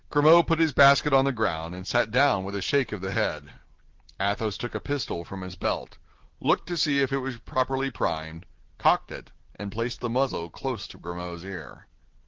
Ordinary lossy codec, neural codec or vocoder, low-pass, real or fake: Opus, 16 kbps; none; 7.2 kHz; real